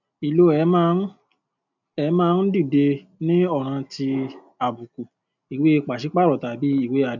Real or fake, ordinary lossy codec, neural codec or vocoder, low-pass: real; none; none; 7.2 kHz